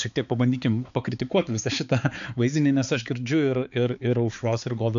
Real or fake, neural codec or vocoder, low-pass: fake; codec, 16 kHz, 4 kbps, X-Codec, HuBERT features, trained on balanced general audio; 7.2 kHz